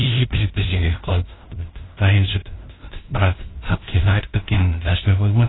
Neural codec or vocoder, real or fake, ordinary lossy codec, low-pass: codec, 16 kHz, 0.5 kbps, FunCodec, trained on LibriTTS, 25 frames a second; fake; AAC, 16 kbps; 7.2 kHz